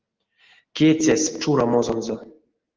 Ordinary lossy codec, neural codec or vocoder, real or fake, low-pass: Opus, 16 kbps; none; real; 7.2 kHz